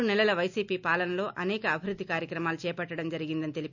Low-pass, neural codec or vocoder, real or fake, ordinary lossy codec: 7.2 kHz; none; real; none